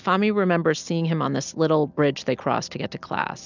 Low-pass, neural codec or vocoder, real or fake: 7.2 kHz; none; real